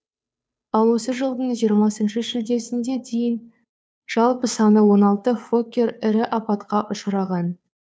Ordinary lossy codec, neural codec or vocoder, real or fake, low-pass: none; codec, 16 kHz, 2 kbps, FunCodec, trained on Chinese and English, 25 frames a second; fake; none